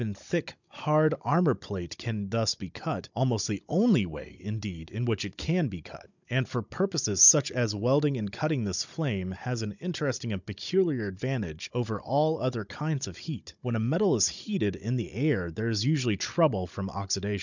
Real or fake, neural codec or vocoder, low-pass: fake; codec, 16 kHz, 16 kbps, FunCodec, trained on Chinese and English, 50 frames a second; 7.2 kHz